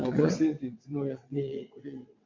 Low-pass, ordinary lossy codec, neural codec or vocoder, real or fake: 7.2 kHz; MP3, 48 kbps; vocoder, 22.05 kHz, 80 mel bands, WaveNeXt; fake